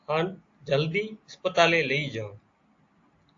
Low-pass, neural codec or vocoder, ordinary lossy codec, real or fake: 7.2 kHz; none; AAC, 48 kbps; real